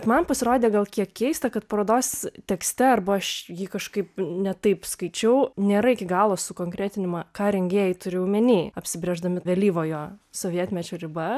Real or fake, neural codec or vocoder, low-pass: real; none; 14.4 kHz